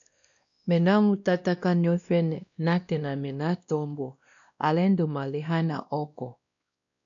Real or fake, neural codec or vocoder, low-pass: fake; codec, 16 kHz, 1 kbps, X-Codec, WavLM features, trained on Multilingual LibriSpeech; 7.2 kHz